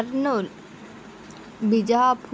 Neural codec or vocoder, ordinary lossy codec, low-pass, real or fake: none; none; none; real